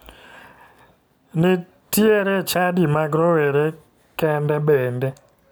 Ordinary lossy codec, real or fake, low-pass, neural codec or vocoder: none; real; none; none